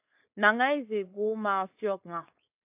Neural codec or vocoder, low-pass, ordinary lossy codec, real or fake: codec, 16 kHz, 4.8 kbps, FACodec; 3.6 kHz; AAC, 32 kbps; fake